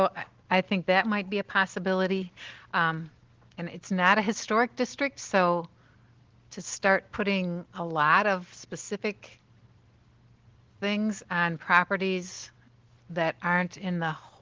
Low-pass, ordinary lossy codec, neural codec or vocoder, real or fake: 7.2 kHz; Opus, 16 kbps; none; real